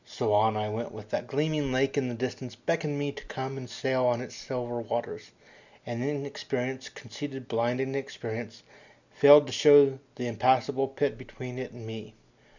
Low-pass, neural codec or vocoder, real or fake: 7.2 kHz; none; real